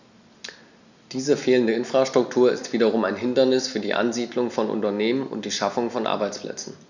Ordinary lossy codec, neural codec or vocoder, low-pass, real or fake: none; none; 7.2 kHz; real